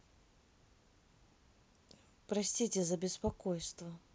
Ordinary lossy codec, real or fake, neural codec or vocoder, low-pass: none; real; none; none